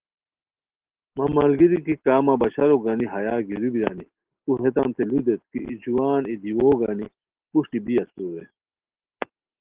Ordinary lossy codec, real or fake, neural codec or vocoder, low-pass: Opus, 16 kbps; real; none; 3.6 kHz